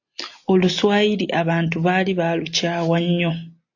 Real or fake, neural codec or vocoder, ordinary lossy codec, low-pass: real; none; AAC, 48 kbps; 7.2 kHz